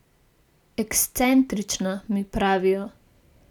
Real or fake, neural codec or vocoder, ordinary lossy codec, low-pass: real; none; none; 19.8 kHz